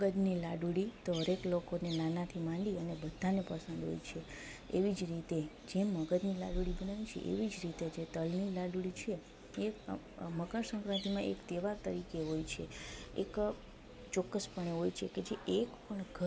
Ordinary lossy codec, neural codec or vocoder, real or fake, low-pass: none; none; real; none